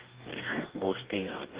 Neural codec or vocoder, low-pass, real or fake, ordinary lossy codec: codec, 44.1 kHz, 2.6 kbps, DAC; 3.6 kHz; fake; Opus, 16 kbps